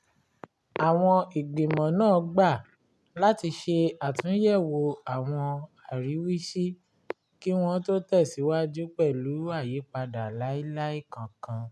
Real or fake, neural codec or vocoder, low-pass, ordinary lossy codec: real; none; none; none